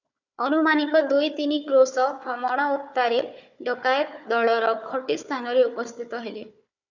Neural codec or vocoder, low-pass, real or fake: codec, 16 kHz, 4 kbps, FunCodec, trained on Chinese and English, 50 frames a second; 7.2 kHz; fake